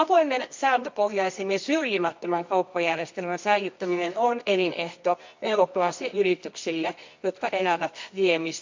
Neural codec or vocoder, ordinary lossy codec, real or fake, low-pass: codec, 24 kHz, 0.9 kbps, WavTokenizer, medium music audio release; MP3, 48 kbps; fake; 7.2 kHz